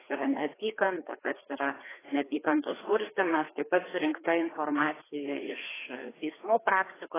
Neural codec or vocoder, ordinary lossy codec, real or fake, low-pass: codec, 16 kHz, 2 kbps, FreqCodec, larger model; AAC, 16 kbps; fake; 3.6 kHz